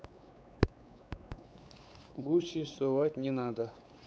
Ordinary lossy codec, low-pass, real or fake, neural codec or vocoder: none; none; fake; codec, 16 kHz, 4 kbps, X-Codec, WavLM features, trained on Multilingual LibriSpeech